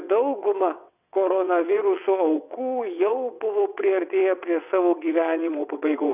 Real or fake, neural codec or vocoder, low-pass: fake; vocoder, 22.05 kHz, 80 mel bands, WaveNeXt; 3.6 kHz